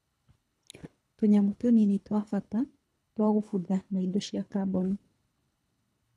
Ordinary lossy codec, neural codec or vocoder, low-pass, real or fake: none; codec, 24 kHz, 3 kbps, HILCodec; none; fake